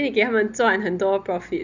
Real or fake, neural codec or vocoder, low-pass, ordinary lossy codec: real; none; 7.2 kHz; none